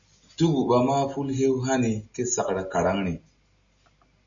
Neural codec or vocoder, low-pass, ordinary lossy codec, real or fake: none; 7.2 kHz; MP3, 64 kbps; real